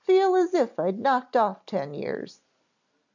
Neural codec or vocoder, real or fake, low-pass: none; real; 7.2 kHz